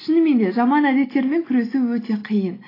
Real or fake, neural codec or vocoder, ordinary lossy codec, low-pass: real; none; AAC, 24 kbps; 5.4 kHz